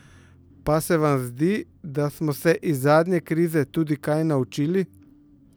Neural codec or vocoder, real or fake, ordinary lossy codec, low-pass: none; real; none; none